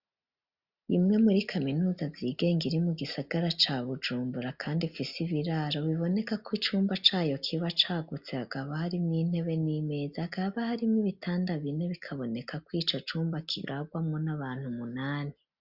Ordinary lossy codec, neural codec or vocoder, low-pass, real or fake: Opus, 64 kbps; none; 5.4 kHz; real